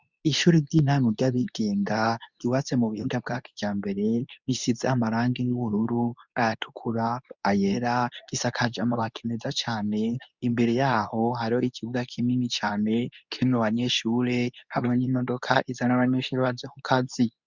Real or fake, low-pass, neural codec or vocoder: fake; 7.2 kHz; codec, 24 kHz, 0.9 kbps, WavTokenizer, medium speech release version 2